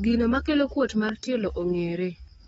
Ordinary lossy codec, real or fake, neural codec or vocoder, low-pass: AAC, 24 kbps; fake; codec, 44.1 kHz, 7.8 kbps, DAC; 19.8 kHz